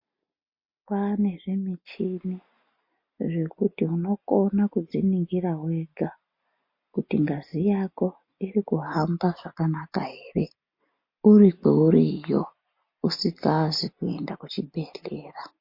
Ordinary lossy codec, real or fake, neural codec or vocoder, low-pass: MP3, 32 kbps; real; none; 5.4 kHz